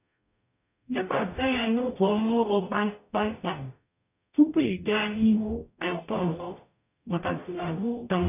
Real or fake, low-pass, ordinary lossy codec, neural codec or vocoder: fake; 3.6 kHz; none; codec, 44.1 kHz, 0.9 kbps, DAC